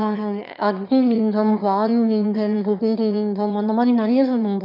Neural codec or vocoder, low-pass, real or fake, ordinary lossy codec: autoencoder, 22.05 kHz, a latent of 192 numbers a frame, VITS, trained on one speaker; 5.4 kHz; fake; none